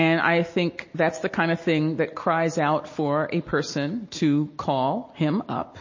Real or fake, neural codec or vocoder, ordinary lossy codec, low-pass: real; none; MP3, 32 kbps; 7.2 kHz